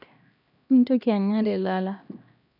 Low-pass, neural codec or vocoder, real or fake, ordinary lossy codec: 5.4 kHz; codec, 16 kHz, 1 kbps, X-Codec, HuBERT features, trained on LibriSpeech; fake; none